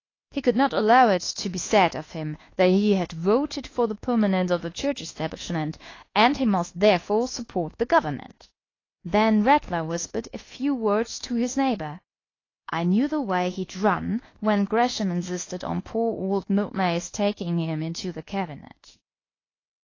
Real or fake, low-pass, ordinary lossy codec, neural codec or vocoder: fake; 7.2 kHz; AAC, 32 kbps; codec, 24 kHz, 1.2 kbps, DualCodec